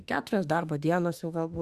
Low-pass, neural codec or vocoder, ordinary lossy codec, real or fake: 14.4 kHz; autoencoder, 48 kHz, 32 numbers a frame, DAC-VAE, trained on Japanese speech; Opus, 64 kbps; fake